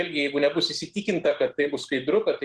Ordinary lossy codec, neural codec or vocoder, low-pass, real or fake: Opus, 16 kbps; none; 10.8 kHz; real